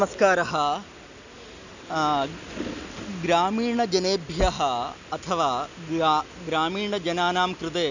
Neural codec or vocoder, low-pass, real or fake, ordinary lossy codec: none; 7.2 kHz; real; none